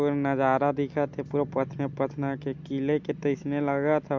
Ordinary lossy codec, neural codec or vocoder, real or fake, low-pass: MP3, 64 kbps; none; real; 7.2 kHz